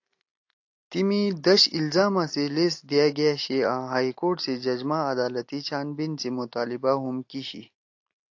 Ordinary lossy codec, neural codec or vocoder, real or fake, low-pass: AAC, 48 kbps; none; real; 7.2 kHz